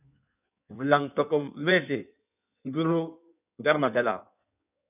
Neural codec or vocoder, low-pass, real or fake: codec, 16 kHz in and 24 kHz out, 1.1 kbps, FireRedTTS-2 codec; 3.6 kHz; fake